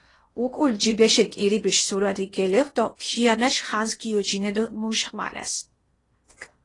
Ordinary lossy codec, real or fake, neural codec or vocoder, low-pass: AAC, 32 kbps; fake; codec, 16 kHz in and 24 kHz out, 0.8 kbps, FocalCodec, streaming, 65536 codes; 10.8 kHz